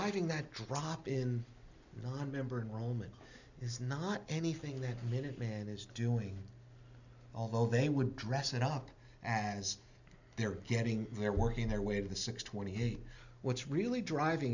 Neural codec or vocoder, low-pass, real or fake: none; 7.2 kHz; real